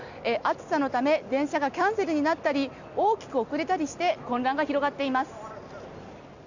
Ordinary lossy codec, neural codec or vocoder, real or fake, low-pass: none; none; real; 7.2 kHz